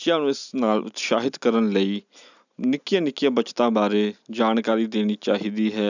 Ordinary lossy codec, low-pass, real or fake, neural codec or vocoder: none; 7.2 kHz; real; none